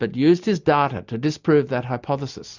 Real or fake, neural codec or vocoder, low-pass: real; none; 7.2 kHz